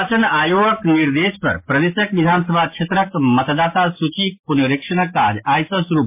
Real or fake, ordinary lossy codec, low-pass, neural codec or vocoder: real; MP3, 24 kbps; 3.6 kHz; none